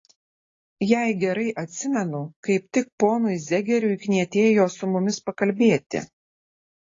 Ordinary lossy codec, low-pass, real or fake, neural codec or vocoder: AAC, 32 kbps; 7.2 kHz; real; none